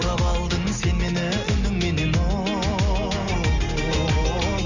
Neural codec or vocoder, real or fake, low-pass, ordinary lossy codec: none; real; 7.2 kHz; none